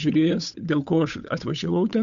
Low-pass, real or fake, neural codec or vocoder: 7.2 kHz; fake; codec, 16 kHz, 8 kbps, FunCodec, trained on Chinese and English, 25 frames a second